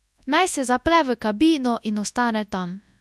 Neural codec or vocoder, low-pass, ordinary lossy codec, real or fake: codec, 24 kHz, 0.9 kbps, WavTokenizer, large speech release; none; none; fake